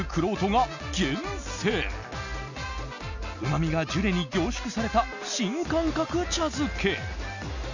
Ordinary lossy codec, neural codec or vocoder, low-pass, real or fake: none; none; 7.2 kHz; real